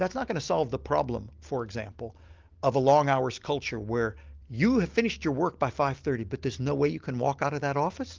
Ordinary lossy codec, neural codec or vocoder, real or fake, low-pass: Opus, 32 kbps; none; real; 7.2 kHz